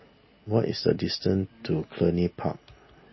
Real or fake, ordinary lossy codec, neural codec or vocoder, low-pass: real; MP3, 24 kbps; none; 7.2 kHz